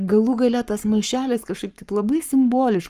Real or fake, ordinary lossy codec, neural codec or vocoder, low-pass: fake; Opus, 32 kbps; codec, 44.1 kHz, 7.8 kbps, Pupu-Codec; 14.4 kHz